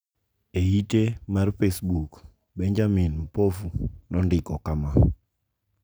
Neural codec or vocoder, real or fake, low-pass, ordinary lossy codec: none; real; none; none